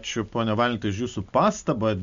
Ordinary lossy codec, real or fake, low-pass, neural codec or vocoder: MP3, 64 kbps; real; 7.2 kHz; none